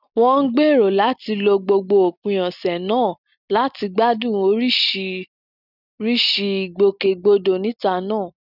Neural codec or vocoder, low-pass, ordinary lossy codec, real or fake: none; 5.4 kHz; none; real